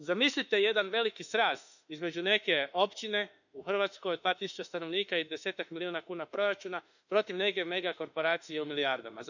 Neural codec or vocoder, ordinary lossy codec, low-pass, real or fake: autoencoder, 48 kHz, 32 numbers a frame, DAC-VAE, trained on Japanese speech; none; 7.2 kHz; fake